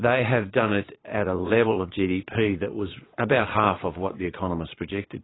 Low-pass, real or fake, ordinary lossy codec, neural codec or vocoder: 7.2 kHz; fake; AAC, 16 kbps; vocoder, 22.05 kHz, 80 mel bands, Vocos